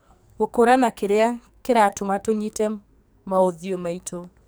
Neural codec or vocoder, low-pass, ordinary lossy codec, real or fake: codec, 44.1 kHz, 2.6 kbps, SNAC; none; none; fake